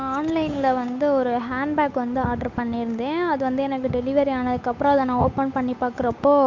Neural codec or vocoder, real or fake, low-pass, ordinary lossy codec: codec, 16 kHz, 8 kbps, FunCodec, trained on Chinese and English, 25 frames a second; fake; 7.2 kHz; MP3, 48 kbps